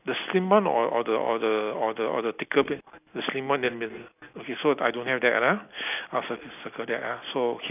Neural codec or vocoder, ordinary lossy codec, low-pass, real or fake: none; none; 3.6 kHz; real